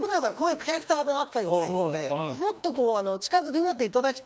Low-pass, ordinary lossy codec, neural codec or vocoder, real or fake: none; none; codec, 16 kHz, 1 kbps, FreqCodec, larger model; fake